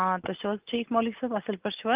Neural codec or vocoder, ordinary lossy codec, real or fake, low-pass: none; Opus, 24 kbps; real; 3.6 kHz